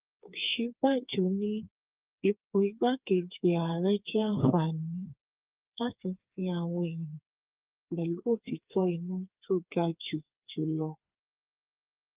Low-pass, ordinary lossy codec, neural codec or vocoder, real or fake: 3.6 kHz; Opus, 32 kbps; codec, 16 kHz, 4 kbps, FreqCodec, smaller model; fake